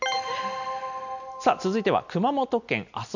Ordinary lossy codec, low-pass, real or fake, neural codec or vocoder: none; 7.2 kHz; real; none